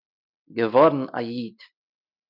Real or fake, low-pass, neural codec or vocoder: real; 5.4 kHz; none